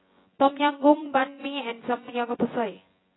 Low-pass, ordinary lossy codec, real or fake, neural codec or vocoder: 7.2 kHz; AAC, 16 kbps; fake; vocoder, 24 kHz, 100 mel bands, Vocos